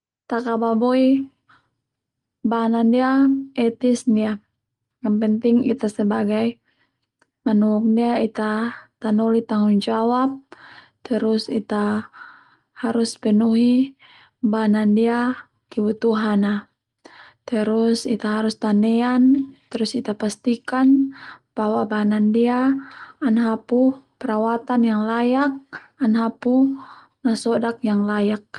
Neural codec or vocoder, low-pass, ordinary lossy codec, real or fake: none; 10.8 kHz; Opus, 24 kbps; real